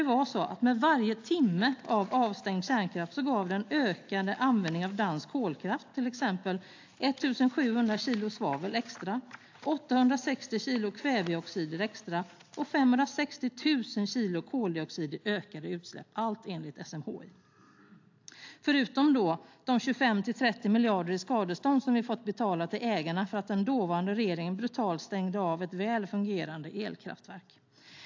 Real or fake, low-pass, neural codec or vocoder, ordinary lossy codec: real; 7.2 kHz; none; AAC, 48 kbps